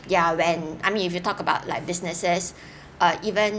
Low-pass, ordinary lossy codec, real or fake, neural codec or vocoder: none; none; real; none